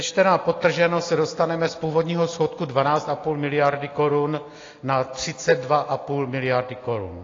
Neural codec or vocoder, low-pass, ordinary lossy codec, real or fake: none; 7.2 kHz; AAC, 32 kbps; real